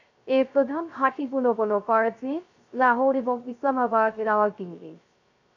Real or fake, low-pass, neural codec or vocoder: fake; 7.2 kHz; codec, 16 kHz, 0.3 kbps, FocalCodec